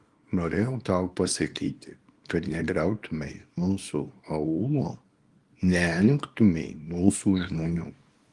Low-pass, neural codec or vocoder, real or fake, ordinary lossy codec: 10.8 kHz; codec, 24 kHz, 0.9 kbps, WavTokenizer, small release; fake; Opus, 24 kbps